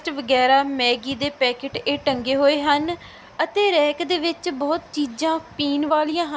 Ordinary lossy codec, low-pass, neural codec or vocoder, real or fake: none; none; none; real